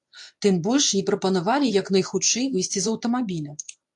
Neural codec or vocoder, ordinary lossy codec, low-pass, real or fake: codec, 24 kHz, 0.9 kbps, WavTokenizer, medium speech release version 1; AAC, 64 kbps; 10.8 kHz; fake